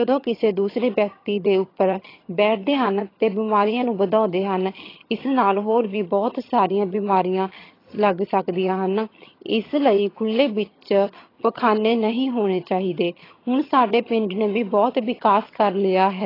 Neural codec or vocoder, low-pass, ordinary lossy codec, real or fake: vocoder, 22.05 kHz, 80 mel bands, HiFi-GAN; 5.4 kHz; AAC, 24 kbps; fake